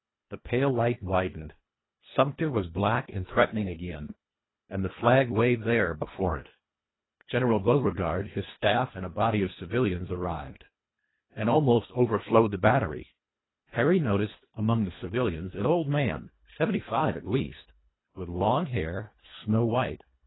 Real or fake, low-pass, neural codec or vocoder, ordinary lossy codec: fake; 7.2 kHz; codec, 24 kHz, 1.5 kbps, HILCodec; AAC, 16 kbps